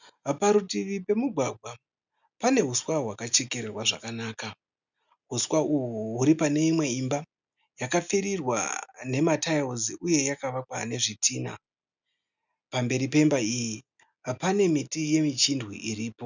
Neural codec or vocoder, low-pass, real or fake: none; 7.2 kHz; real